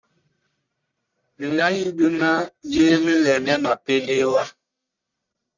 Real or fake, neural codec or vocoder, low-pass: fake; codec, 44.1 kHz, 1.7 kbps, Pupu-Codec; 7.2 kHz